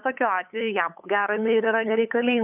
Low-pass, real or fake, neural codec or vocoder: 3.6 kHz; fake; codec, 16 kHz, 8 kbps, FunCodec, trained on LibriTTS, 25 frames a second